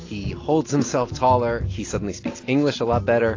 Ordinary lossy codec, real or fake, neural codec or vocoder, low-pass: AAC, 32 kbps; real; none; 7.2 kHz